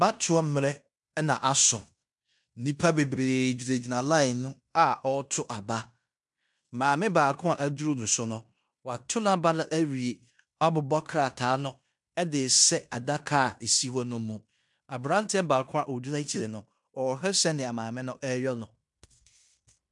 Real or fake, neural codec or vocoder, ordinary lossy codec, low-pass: fake; codec, 16 kHz in and 24 kHz out, 0.9 kbps, LongCat-Audio-Codec, fine tuned four codebook decoder; MP3, 96 kbps; 10.8 kHz